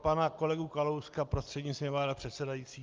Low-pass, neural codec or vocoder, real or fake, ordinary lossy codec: 7.2 kHz; none; real; Opus, 32 kbps